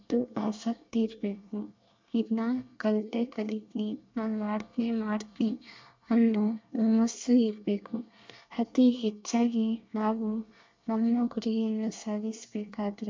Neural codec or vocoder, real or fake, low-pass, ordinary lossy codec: codec, 24 kHz, 1 kbps, SNAC; fake; 7.2 kHz; MP3, 64 kbps